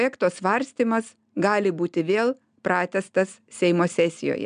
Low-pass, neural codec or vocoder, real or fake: 9.9 kHz; none; real